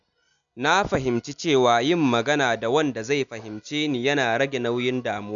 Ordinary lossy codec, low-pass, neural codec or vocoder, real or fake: none; 7.2 kHz; none; real